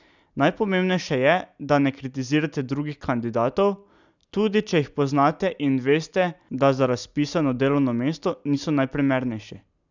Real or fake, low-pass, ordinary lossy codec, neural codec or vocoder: real; 7.2 kHz; none; none